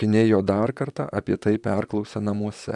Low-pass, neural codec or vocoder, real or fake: 10.8 kHz; vocoder, 44.1 kHz, 128 mel bands, Pupu-Vocoder; fake